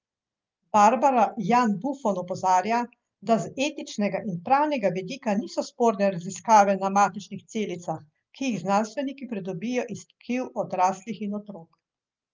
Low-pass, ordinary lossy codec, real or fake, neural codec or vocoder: 7.2 kHz; Opus, 24 kbps; real; none